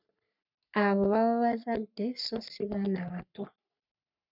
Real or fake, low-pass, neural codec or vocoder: fake; 5.4 kHz; codec, 44.1 kHz, 3.4 kbps, Pupu-Codec